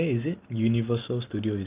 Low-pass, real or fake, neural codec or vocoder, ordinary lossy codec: 3.6 kHz; real; none; Opus, 32 kbps